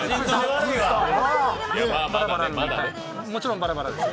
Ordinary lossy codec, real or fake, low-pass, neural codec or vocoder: none; real; none; none